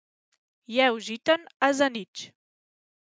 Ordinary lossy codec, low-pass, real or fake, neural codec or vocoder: none; none; real; none